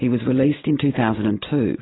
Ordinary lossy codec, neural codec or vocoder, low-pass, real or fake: AAC, 16 kbps; none; 7.2 kHz; real